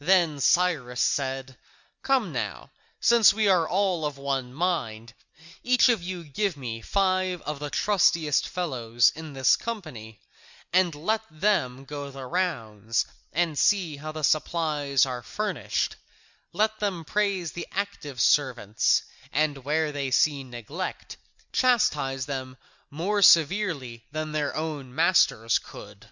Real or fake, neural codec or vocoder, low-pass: real; none; 7.2 kHz